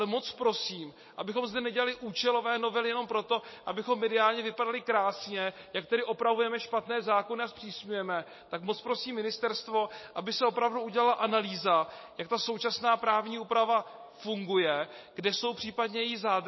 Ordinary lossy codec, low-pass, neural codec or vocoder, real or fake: MP3, 24 kbps; 7.2 kHz; none; real